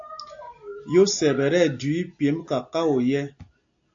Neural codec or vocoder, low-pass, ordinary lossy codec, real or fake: none; 7.2 kHz; AAC, 64 kbps; real